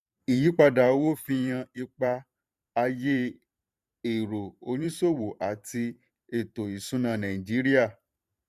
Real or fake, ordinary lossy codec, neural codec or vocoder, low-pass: fake; none; vocoder, 44.1 kHz, 128 mel bands every 512 samples, BigVGAN v2; 14.4 kHz